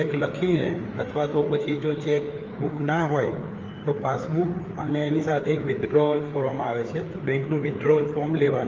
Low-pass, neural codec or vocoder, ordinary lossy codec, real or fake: 7.2 kHz; codec, 16 kHz, 4 kbps, FreqCodec, larger model; Opus, 32 kbps; fake